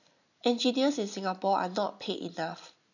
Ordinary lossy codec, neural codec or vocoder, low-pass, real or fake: none; none; 7.2 kHz; real